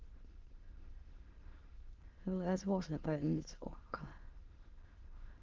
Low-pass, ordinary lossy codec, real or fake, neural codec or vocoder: 7.2 kHz; Opus, 16 kbps; fake; autoencoder, 22.05 kHz, a latent of 192 numbers a frame, VITS, trained on many speakers